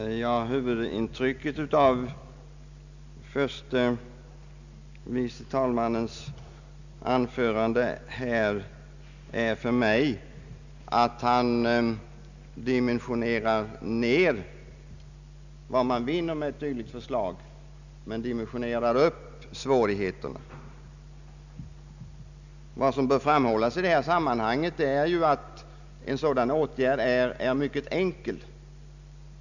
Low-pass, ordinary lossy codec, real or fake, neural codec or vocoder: 7.2 kHz; none; real; none